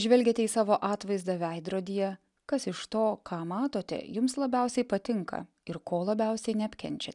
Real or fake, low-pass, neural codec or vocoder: real; 10.8 kHz; none